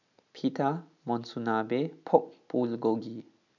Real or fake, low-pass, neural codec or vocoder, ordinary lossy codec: real; 7.2 kHz; none; none